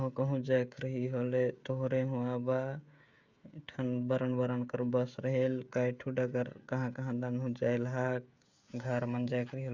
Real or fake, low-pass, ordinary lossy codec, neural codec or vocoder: fake; 7.2 kHz; Opus, 64 kbps; codec, 16 kHz, 16 kbps, FreqCodec, smaller model